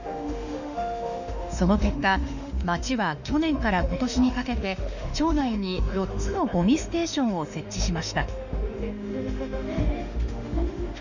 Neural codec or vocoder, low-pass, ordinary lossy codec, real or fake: autoencoder, 48 kHz, 32 numbers a frame, DAC-VAE, trained on Japanese speech; 7.2 kHz; none; fake